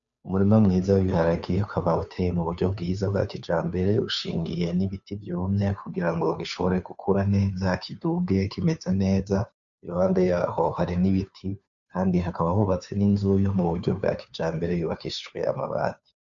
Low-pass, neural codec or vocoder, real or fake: 7.2 kHz; codec, 16 kHz, 2 kbps, FunCodec, trained on Chinese and English, 25 frames a second; fake